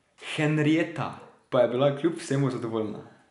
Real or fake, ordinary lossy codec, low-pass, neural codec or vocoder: real; none; 10.8 kHz; none